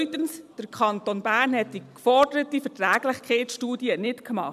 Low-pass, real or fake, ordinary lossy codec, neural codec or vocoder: 14.4 kHz; real; none; none